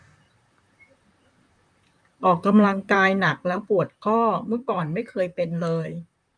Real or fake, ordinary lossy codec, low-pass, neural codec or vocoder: fake; none; 9.9 kHz; vocoder, 44.1 kHz, 128 mel bands, Pupu-Vocoder